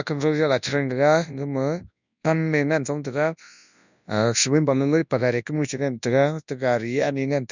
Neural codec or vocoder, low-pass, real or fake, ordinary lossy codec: codec, 24 kHz, 0.9 kbps, WavTokenizer, large speech release; 7.2 kHz; fake; none